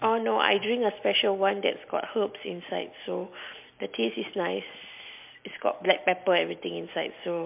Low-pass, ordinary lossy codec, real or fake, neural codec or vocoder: 3.6 kHz; MP3, 32 kbps; real; none